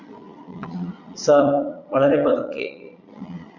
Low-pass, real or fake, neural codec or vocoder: 7.2 kHz; fake; vocoder, 22.05 kHz, 80 mel bands, Vocos